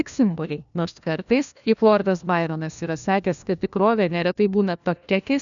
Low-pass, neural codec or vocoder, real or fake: 7.2 kHz; codec, 16 kHz, 1 kbps, FunCodec, trained on LibriTTS, 50 frames a second; fake